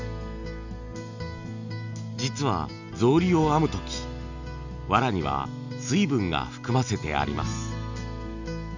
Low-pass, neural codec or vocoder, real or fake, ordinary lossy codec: 7.2 kHz; none; real; none